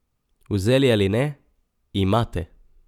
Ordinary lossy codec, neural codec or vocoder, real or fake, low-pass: none; none; real; 19.8 kHz